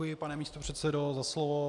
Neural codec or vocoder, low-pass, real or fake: none; 10.8 kHz; real